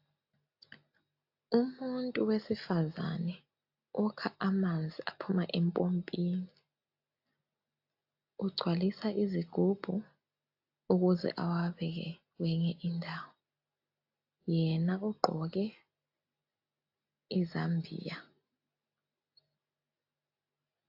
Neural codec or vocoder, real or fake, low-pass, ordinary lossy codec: none; real; 5.4 kHz; AAC, 48 kbps